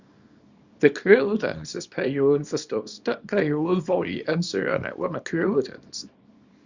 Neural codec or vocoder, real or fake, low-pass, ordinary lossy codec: codec, 24 kHz, 0.9 kbps, WavTokenizer, small release; fake; 7.2 kHz; Opus, 64 kbps